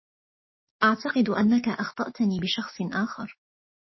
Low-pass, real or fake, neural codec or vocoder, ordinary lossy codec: 7.2 kHz; fake; codec, 16 kHz, 6 kbps, DAC; MP3, 24 kbps